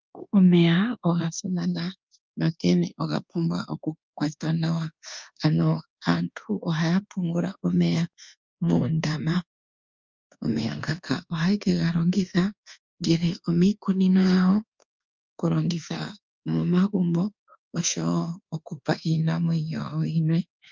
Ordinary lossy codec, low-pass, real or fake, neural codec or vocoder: Opus, 32 kbps; 7.2 kHz; fake; codec, 24 kHz, 1.2 kbps, DualCodec